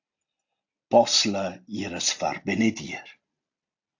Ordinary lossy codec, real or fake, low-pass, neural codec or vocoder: AAC, 48 kbps; real; 7.2 kHz; none